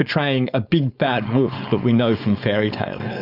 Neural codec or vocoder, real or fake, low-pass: codec, 16 kHz, 4.8 kbps, FACodec; fake; 5.4 kHz